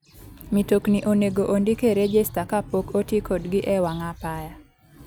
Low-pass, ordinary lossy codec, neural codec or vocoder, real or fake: none; none; none; real